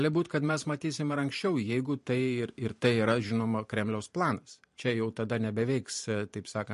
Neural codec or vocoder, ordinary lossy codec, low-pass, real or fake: vocoder, 44.1 kHz, 128 mel bands every 512 samples, BigVGAN v2; MP3, 48 kbps; 14.4 kHz; fake